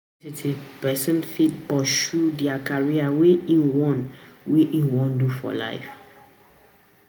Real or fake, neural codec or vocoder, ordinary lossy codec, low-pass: real; none; none; none